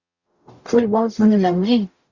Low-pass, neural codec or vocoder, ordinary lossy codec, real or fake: 7.2 kHz; codec, 44.1 kHz, 0.9 kbps, DAC; Opus, 64 kbps; fake